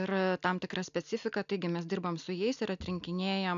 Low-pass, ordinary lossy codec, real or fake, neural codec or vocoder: 7.2 kHz; AAC, 96 kbps; real; none